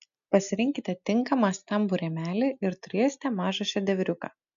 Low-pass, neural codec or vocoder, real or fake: 7.2 kHz; none; real